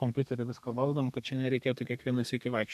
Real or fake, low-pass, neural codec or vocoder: fake; 14.4 kHz; codec, 32 kHz, 1.9 kbps, SNAC